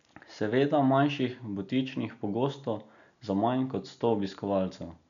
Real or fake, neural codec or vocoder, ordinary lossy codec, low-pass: real; none; none; 7.2 kHz